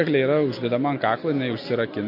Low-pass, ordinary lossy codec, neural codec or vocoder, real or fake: 5.4 kHz; MP3, 32 kbps; none; real